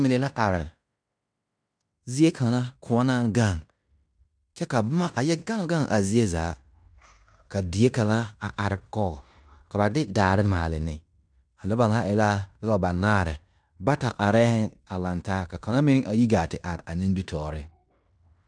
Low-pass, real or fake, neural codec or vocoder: 9.9 kHz; fake; codec, 16 kHz in and 24 kHz out, 0.9 kbps, LongCat-Audio-Codec, fine tuned four codebook decoder